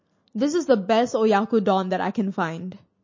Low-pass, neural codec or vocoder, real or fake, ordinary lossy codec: 7.2 kHz; none; real; MP3, 32 kbps